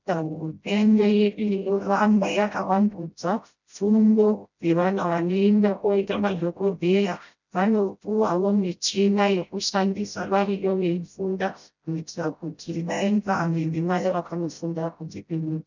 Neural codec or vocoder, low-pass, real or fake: codec, 16 kHz, 0.5 kbps, FreqCodec, smaller model; 7.2 kHz; fake